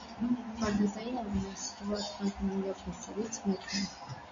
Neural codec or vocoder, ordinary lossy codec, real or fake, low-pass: none; AAC, 48 kbps; real; 7.2 kHz